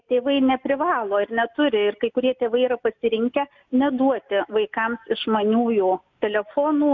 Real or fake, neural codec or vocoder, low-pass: real; none; 7.2 kHz